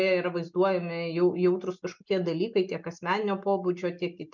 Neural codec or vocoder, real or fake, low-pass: none; real; 7.2 kHz